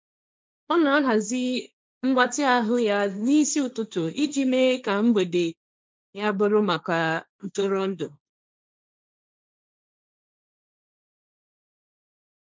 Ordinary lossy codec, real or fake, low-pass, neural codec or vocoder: none; fake; none; codec, 16 kHz, 1.1 kbps, Voila-Tokenizer